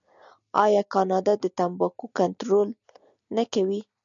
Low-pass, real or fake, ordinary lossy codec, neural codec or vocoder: 7.2 kHz; real; MP3, 96 kbps; none